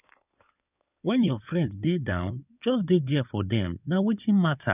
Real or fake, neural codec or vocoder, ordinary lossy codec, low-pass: fake; codec, 16 kHz in and 24 kHz out, 2.2 kbps, FireRedTTS-2 codec; none; 3.6 kHz